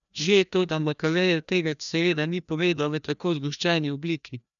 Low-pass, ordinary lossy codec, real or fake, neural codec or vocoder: 7.2 kHz; none; fake; codec, 16 kHz, 1 kbps, FreqCodec, larger model